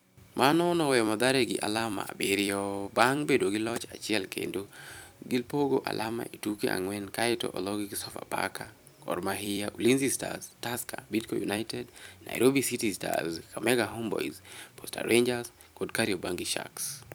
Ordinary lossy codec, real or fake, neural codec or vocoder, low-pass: none; fake; vocoder, 44.1 kHz, 128 mel bands every 256 samples, BigVGAN v2; none